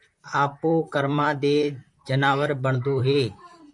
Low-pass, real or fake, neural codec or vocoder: 10.8 kHz; fake; vocoder, 44.1 kHz, 128 mel bands, Pupu-Vocoder